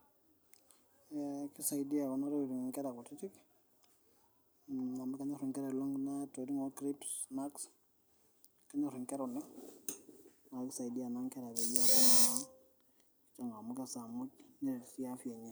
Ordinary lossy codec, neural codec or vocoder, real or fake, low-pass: none; none; real; none